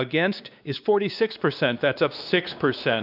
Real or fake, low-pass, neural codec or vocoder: fake; 5.4 kHz; codec, 16 kHz, 2 kbps, X-Codec, WavLM features, trained on Multilingual LibriSpeech